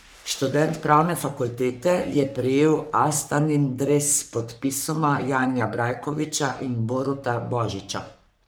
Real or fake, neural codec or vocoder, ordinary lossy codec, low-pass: fake; codec, 44.1 kHz, 3.4 kbps, Pupu-Codec; none; none